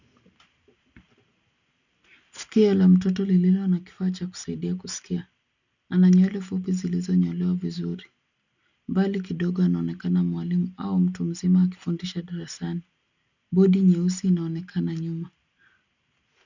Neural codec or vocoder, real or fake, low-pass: none; real; 7.2 kHz